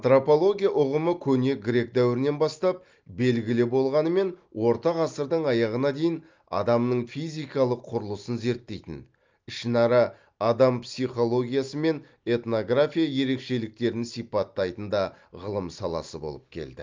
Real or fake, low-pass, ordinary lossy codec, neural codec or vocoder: real; 7.2 kHz; Opus, 24 kbps; none